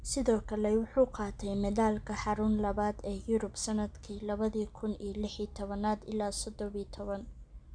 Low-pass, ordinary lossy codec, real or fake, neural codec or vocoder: 9.9 kHz; none; real; none